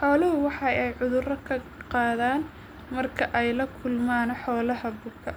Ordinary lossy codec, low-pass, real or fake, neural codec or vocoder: none; none; real; none